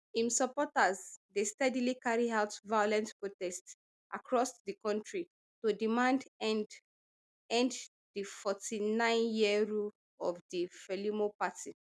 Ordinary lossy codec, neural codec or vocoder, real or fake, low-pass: none; none; real; none